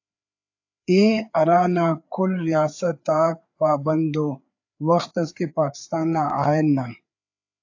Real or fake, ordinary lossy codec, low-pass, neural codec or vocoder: fake; AAC, 48 kbps; 7.2 kHz; codec, 16 kHz, 4 kbps, FreqCodec, larger model